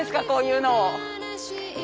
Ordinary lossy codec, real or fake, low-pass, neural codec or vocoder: none; real; none; none